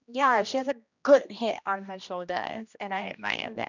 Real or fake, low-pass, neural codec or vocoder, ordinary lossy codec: fake; 7.2 kHz; codec, 16 kHz, 1 kbps, X-Codec, HuBERT features, trained on general audio; none